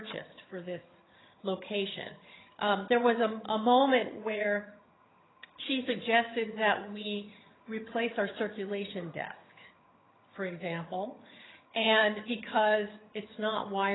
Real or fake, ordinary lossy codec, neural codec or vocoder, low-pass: fake; AAC, 16 kbps; vocoder, 22.05 kHz, 80 mel bands, HiFi-GAN; 7.2 kHz